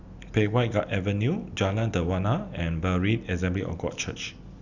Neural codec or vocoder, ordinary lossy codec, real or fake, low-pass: none; none; real; 7.2 kHz